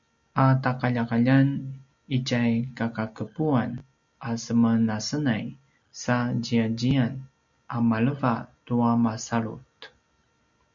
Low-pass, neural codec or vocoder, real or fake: 7.2 kHz; none; real